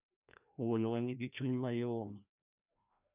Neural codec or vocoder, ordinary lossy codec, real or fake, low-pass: codec, 16 kHz, 1 kbps, FreqCodec, larger model; none; fake; 3.6 kHz